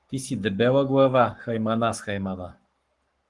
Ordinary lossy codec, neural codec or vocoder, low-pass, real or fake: Opus, 24 kbps; codec, 44.1 kHz, 7.8 kbps, Pupu-Codec; 10.8 kHz; fake